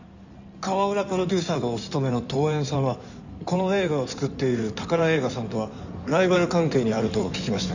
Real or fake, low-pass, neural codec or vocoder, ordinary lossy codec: fake; 7.2 kHz; codec, 16 kHz in and 24 kHz out, 2.2 kbps, FireRedTTS-2 codec; none